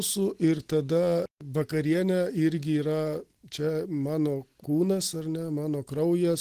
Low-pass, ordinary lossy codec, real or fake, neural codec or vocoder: 14.4 kHz; Opus, 16 kbps; real; none